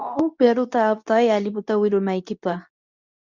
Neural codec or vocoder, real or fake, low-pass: codec, 24 kHz, 0.9 kbps, WavTokenizer, medium speech release version 2; fake; 7.2 kHz